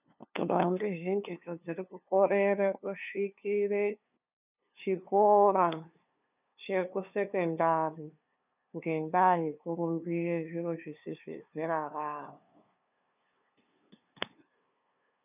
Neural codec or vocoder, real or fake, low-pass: codec, 16 kHz, 2 kbps, FunCodec, trained on LibriTTS, 25 frames a second; fake; 3.6 kHz